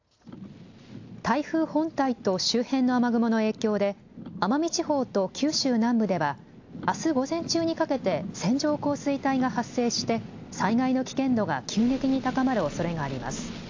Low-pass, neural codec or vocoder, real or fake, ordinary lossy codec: 7.2 kHz; none; real; AAC, 48 kbps